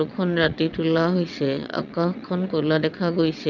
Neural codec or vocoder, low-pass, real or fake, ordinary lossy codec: vocoder, 22.05 kHz, 80 mel bands, WaveNeXt; 7.2 kHz; fake; none